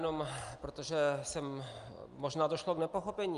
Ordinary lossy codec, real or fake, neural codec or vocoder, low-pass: Opus, 32 kbps; real; none; 10.8 kHz